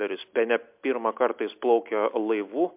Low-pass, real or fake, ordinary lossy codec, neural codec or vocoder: 3.6 kHz; real; MP3, 32 kbps; none